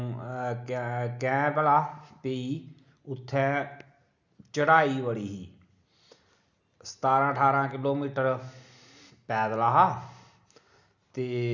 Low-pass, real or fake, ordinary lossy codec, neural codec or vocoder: 7.2 kHz; real; none; none